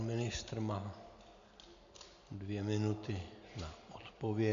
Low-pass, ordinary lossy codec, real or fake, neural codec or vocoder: 7.2 kHz; MP3, 64 kbps; real; none